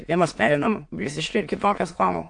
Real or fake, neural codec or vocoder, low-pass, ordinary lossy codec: fake; autoencoder, 22.05 kHz, a latent of 192 numbers a frame, VITS, trained on many speakers; 9.9 kHz; AAC, 64 kbps